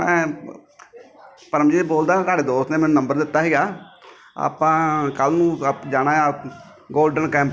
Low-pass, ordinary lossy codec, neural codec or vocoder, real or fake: none; none; none; real